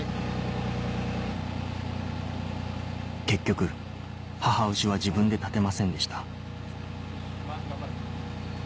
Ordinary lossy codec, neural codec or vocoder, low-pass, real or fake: none; none; none; real